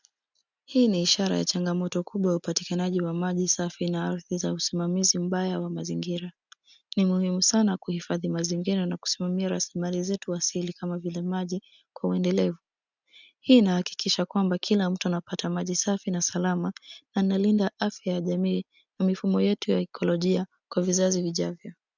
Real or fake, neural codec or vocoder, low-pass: real; none; 7.2 kHz